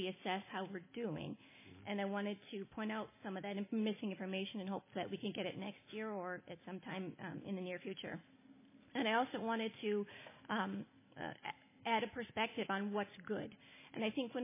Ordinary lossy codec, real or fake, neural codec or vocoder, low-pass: MP3, 16 kbps; real; none; 3.6 kHz